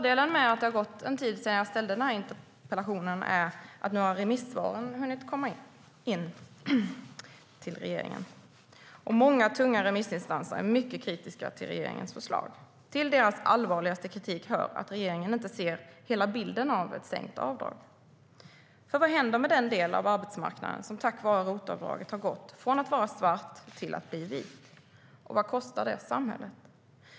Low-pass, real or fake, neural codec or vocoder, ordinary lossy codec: none; real; none; none